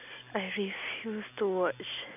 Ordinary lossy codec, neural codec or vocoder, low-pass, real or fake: none; none; 3.6 kHz; real